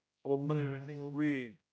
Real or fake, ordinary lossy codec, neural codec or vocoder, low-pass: fake; none; codec, 16 kHz, 0.5 kbps, X-Codec, HuBERT features, trained on general audio; none